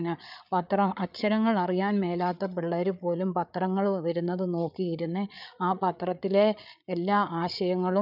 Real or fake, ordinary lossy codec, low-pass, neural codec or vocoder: fake; none; 5.4 kHz; codec, 16 kHz, 8 kbps, FreqCodec, larger model